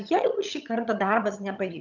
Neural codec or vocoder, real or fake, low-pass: vocoder, 22.05 kHz, 80 mel bands, HiFi-GAN; fake; 7.2 kHz